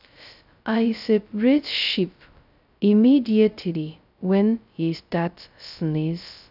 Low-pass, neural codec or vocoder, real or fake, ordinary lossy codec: 5.4 kHz; codec, 16 kHz, 0.2 kbps, FocalCodec; fake; none